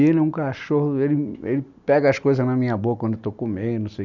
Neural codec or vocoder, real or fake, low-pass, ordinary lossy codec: none; real; 7.2 kHz; none